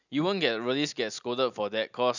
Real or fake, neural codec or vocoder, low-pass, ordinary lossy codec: real; none; 7.2 kHz; none